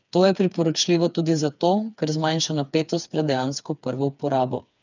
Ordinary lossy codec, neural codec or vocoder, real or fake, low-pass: none; codec, 16 kHz, 4 kbps, FreqCodec, smaller model; fake; 7.2 kHz